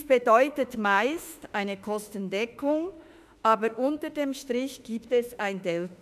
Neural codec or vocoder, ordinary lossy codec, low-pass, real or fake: autoencoder, 48 kHz, 32 numbers a frame, DAC-VAE, trained on Japanese speech; none; 14.4 kHz; fake